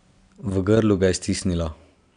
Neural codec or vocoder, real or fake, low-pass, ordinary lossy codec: none; real; 9.9 kHz; none